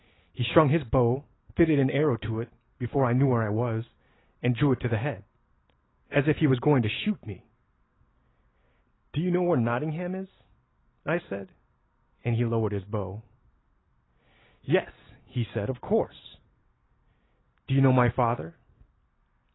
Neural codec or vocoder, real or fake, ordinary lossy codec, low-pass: none; real; AAC, 16 kbps; 7.2 kHz